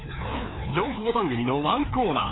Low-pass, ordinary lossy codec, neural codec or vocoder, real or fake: 7.2 kHz; AAC, 16 kbps; codec, 16 kHz, 2 kbps, FreqCodec, larger model; fake